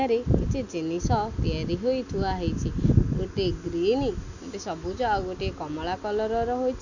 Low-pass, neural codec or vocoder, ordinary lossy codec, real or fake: 7.2 kHz; none; none; real